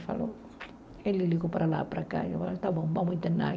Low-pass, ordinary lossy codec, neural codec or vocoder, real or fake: none; none; none; real